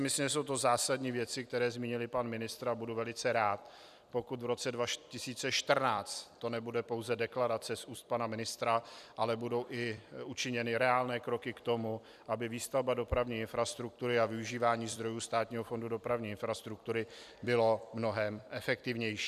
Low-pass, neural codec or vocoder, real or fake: 14.4 kHz; vocoder, 44.1 kHz, 128 mel bands every 256 samples, BigVGAN v2; fake